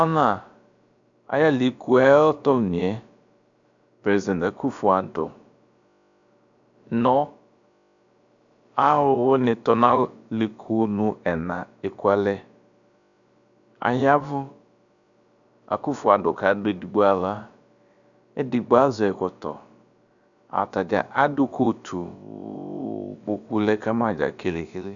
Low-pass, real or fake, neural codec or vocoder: 7.2 kHz; fake; codec, 16 kHz, about 1 kbps, DyCAST, with the encoder's durations